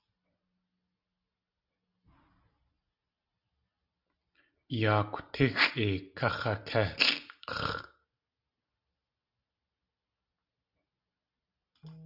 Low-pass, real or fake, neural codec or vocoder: 5.4 kHz; real; none